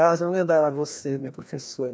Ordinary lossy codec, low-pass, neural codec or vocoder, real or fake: none; none; codec, 16 kHz, 1 kbps, FreqCodec, larger model; fake